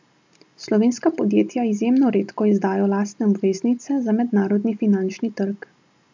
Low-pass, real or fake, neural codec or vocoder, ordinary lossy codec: 7.2 kHz; real; none; MP3, 64 kbps